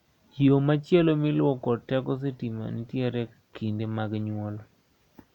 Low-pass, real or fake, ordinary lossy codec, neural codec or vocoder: 19.8 kHz; real; none; none